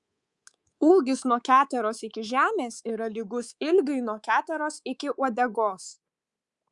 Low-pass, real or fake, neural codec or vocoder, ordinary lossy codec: 10.8 kHz; fake; codec, 24 kHz, 3.1 kbps, DualCodec; Opus, 64 kbps